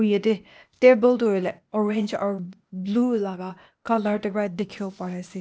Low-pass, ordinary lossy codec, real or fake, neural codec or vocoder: none; none; fake; codec, 16 kHz, 0.8 kbps, ZipCodec